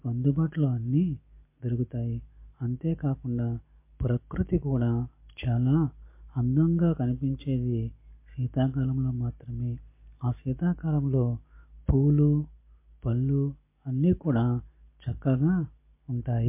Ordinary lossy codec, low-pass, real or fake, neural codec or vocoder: MP3, 24 kbps; 3.6 kHz; real; none